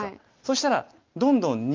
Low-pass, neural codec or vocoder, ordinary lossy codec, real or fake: 7.2 kHz; none; Opus, 24 kbps; real